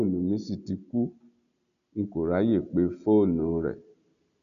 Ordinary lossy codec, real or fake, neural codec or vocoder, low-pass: none; real; none; 7.2 kHz